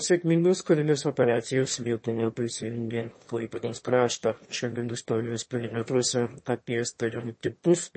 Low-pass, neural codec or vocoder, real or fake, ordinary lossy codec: 9.9 kHz; autoencoder, 22.05 kHz, a latent of 192 numbers a frame, VITS, trained on one speaker; fake; MP3, 32 kbps